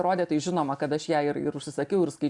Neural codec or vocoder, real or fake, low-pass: none; real; 10.8 kHz